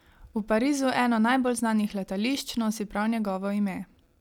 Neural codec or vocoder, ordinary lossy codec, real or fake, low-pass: none; none; real; 19.8 kHz